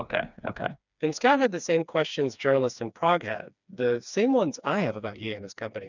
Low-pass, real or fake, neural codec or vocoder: 7.2 kHz; fake; codec, 16 kHz, 2 kbps, FreqCodec, smaller model